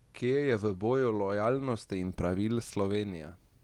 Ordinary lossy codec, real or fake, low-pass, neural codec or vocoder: Opus, 24 kbps; real; 19.8 kHz; none